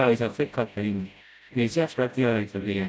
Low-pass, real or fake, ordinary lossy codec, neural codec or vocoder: none; fake; none; codec, 16 kHz, 0.5 kbps, FreqCodec, smaller model